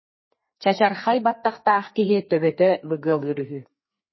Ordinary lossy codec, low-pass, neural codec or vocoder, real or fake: MP3, 24 kbps; 7.2 kHz; codec, 32 kHz, 1.9 kbps, SNAC; fake